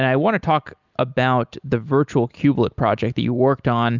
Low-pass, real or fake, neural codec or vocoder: 7.2 kHz; real; none